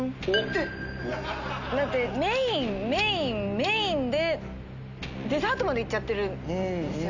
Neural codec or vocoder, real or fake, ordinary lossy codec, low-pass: none; real; none; 7.2 kHz